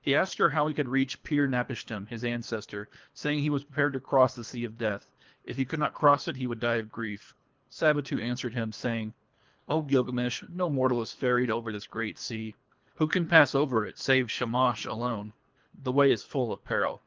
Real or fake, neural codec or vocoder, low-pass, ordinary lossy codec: fake; codec, 24 kHz, 3 kbps, HILCodec; 7.2 kHz; Opus, 24 kbps